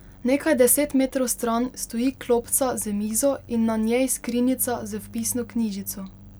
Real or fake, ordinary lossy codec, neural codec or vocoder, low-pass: real; none; none; none